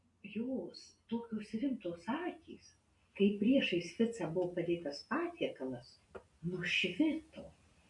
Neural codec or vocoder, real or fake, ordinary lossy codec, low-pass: none; real; AAC, 64 kbps; 10.8 kHz